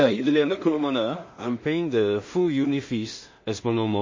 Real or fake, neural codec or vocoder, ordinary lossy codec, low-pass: fake; codec, 16 kHz in and 24 kHz out, 0.4 kbps, LongCat-Audio-Codec, two codebook decoder; MP3, 32 kbps; 7.2 kHz